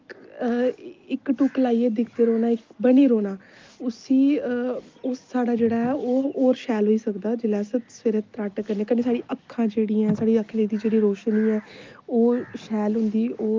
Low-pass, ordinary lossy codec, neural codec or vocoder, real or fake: 7.2 kHz; Opus, 24 kbps; none; real